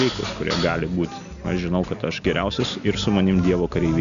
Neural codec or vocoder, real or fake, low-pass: none; real; 7.2 kHz